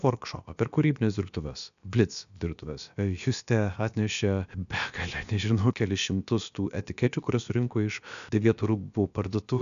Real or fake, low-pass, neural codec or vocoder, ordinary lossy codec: fake; 7.2 kHz; codec, 16 kHz, about 1 kbps, DyCAST, with the encoder's durations; MP3, 96 kbps